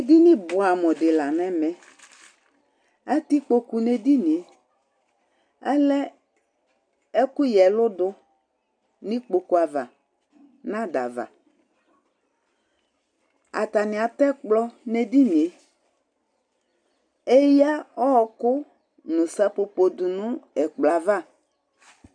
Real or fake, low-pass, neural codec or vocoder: real; 9.9 kHz; none